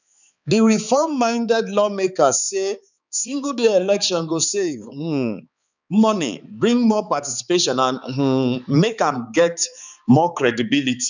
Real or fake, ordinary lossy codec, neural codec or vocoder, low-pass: fake; none; codec, 16 kHz, 4 kbps, X-Codec, HuBERT features, trained on balanced general audio; 7.2 kHz